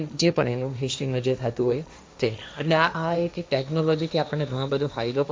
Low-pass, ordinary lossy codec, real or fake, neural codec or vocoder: none; none; fake; codec, 16 kHz, 1.1 kbps, Voila-Tokenizer